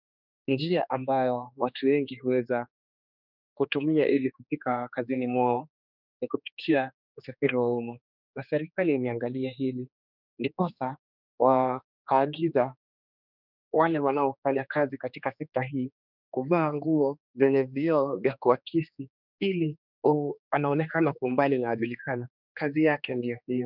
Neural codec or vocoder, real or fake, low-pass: codec, 16 kHz, 2 kbps, X-Codec, HuBERT features, trained on general audio; fake; 5.4 kHz